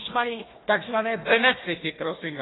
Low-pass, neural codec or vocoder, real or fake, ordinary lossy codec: 7.2 kHz; codec, 16 kHz, 1 kbps, FunCodec, trained on Chinese and English, 50 frames a second; fake; AAC, 16 kbps